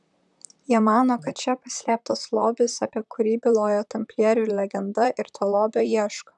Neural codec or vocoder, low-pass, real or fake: vocoder, 44.1 kHz, 128 mel bands, Pupu-Vocoder; 10.8 kHz; fake